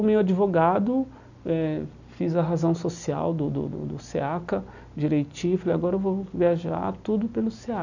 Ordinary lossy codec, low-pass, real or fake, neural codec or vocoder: none; 7.2 kHz; real; none